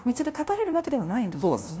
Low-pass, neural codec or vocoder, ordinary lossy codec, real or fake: none; codec, 16 kHz, 0.5 kbps, FunCodec, trained on LibriTTS, 25 frames a second; none; fake